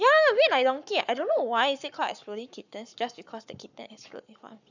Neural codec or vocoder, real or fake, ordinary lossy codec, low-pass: codec, 44.1 kHz, 7.8 kbps, Pupu-Codec; fake; none; 7.2 kHz